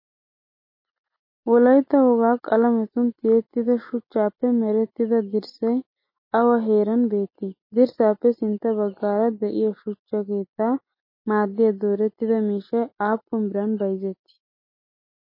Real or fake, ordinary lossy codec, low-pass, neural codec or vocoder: real; MP3, 24 kbps; 5.4 kHz; none